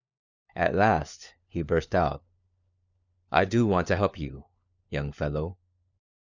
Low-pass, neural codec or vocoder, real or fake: 7.2 kHz; codec, 16 kHz, 4 kbps, FunCodec, trained on LibriTTS, 50 frames a second; fake